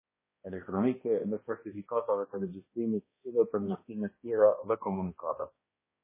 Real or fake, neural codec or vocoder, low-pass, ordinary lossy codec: fake; codec, 16 kHz, 1 kbps, X-Codec, HuBERT features, trained on balanced general audio; 3.6 kHz; MP3, 16 kbps